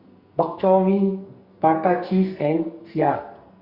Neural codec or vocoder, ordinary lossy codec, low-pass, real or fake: codec, 44.1 kHz, 2.6 kbps, SNAC; Opus, 64 kbps; 5.4 kHz; fake